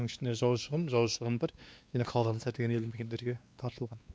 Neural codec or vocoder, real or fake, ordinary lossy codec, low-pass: codec, 16 kHz, 2 kbps, X-Codec, WavLM features, trained on Multilingual LibriSpeech; fake; none; none